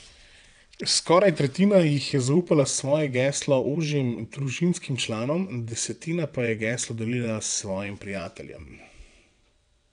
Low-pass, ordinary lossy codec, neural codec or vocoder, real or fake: 9.9 kHz; none; vocoder, 22.05 kHz, 80 mel bands, WaveNeXt; fake